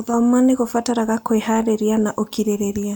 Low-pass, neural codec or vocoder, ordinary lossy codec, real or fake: none; none; none; real